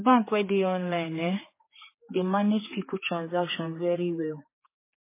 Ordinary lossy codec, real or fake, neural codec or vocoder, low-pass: MP3, 16 kbps; fake; codec, 16 kHz, 4 kbps, X-Codec, HuBERT features, trained on general audio; 3.6 kHz